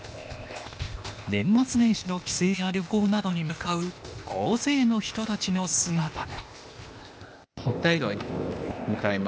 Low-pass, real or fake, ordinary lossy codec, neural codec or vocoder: none; fake; none; codec, 16 kHz, 0.8 kbps, ZipCodec